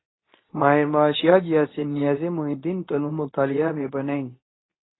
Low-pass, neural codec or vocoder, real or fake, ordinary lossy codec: 7.2 kHz; codec, 24 kHz, 0.9 kbps, WavTokenizer, medium speech release version 2; fake; AAC, 16 kbps